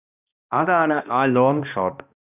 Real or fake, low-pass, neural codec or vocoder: fake; 3.6 kHz; codec, 16 kHz, 1 kbps, X-Codec, HuBERT features, trained on balanced general audio